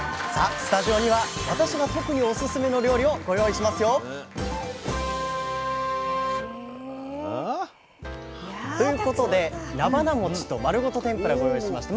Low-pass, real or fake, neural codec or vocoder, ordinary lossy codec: none; real; none; none